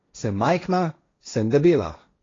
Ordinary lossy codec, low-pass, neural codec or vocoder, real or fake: AAC, 32 kbps; 7.2 kHz; codec, 16 kHz, 1.1 kbps, Voila-Tokenizer; fake